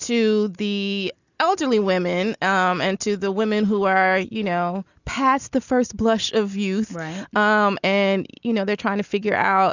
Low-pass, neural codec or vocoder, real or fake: 7.2 kHz; none; real